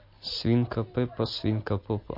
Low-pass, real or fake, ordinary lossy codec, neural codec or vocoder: 5.4 kHz; real; MP3, 32 kbps; none